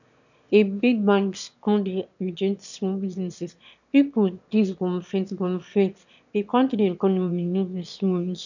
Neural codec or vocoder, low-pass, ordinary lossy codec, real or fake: autoencoder, 22.05 kHz, a latent of 192 numbers a frame, VITS, trained on one speaker; 7.2 kHz; none; fake